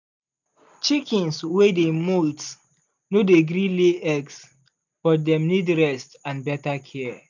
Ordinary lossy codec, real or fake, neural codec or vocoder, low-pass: none; real; none; 7.2 kHz